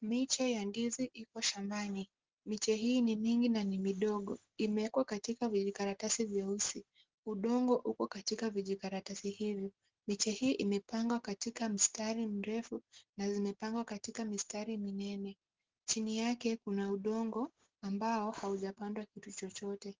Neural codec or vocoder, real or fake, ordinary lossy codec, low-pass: none; real; Opus, 16 kbps; 7.2 kHz